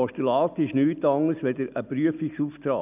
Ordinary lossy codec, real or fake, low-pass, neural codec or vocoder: none; real; 3.6 kHz; none